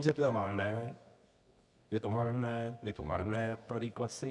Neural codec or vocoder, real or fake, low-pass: codec, 24 kHz, 0.9 kbps, WavTokenizer, medium music audio release; fake; 10.8 kHz